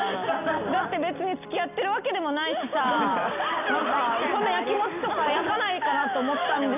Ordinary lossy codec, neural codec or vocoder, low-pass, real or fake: none; none; 3.6 kHz; real